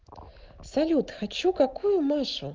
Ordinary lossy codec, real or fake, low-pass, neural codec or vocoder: Opus, 32 kbps; real; 7.2 kHz; none